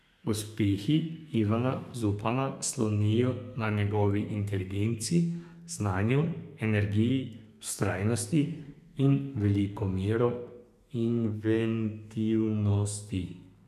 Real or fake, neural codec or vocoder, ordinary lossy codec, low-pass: fake; codec, 32 kHz, 1.9 kbps, SNAC; none; 14.4 kHz